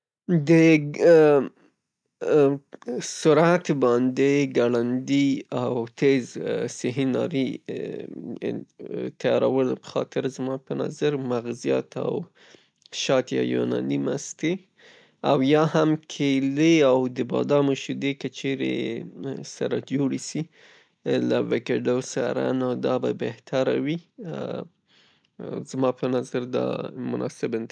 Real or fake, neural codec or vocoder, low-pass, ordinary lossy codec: real; none; 9.9 kHz; none